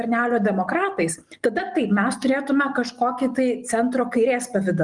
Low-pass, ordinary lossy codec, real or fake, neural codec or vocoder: 10.8 kHz; Opus, 24 kbps; real; none